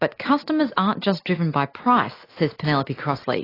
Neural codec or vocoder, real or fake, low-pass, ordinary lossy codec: none; real; 5.4 kHz; AAC, 24 kbps